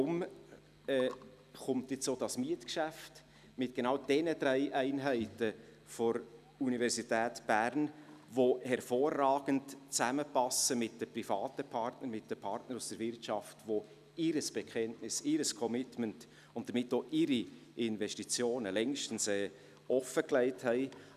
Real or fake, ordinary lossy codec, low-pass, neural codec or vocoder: real; none; 14.4 kHz; none